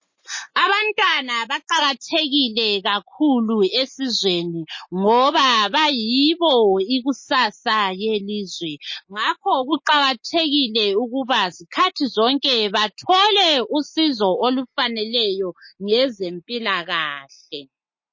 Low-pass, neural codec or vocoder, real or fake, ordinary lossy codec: 7.2 kHz; none; real; MP3, 32 kbps